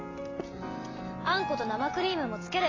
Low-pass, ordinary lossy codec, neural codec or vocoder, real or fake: 7.2 kHz; AAC, 32 kbps; none; real